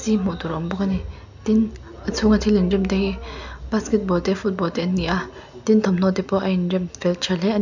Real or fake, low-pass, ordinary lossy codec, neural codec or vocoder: real; 7.2 kHz; none; none